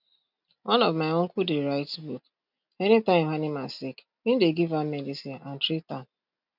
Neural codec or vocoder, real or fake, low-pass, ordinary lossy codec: none; real; 5.4 kHz; none